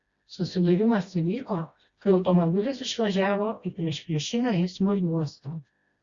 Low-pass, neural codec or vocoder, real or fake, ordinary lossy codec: 7.2 kHz; codec, 16 kHz, 1 kbps, FreqCodec, smaller model; fake; Opus, 64 kbps